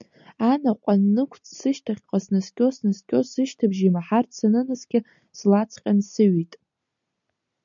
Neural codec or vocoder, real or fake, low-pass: none; real; 7.2 kHz